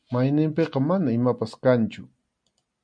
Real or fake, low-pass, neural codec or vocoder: real; 9.9 kHz; none